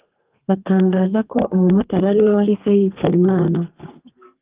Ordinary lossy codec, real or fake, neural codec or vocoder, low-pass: Opus, 32 kbps; fake; codec, 32 kHz, 1.9 kbps, SNAC; 3.6 kHz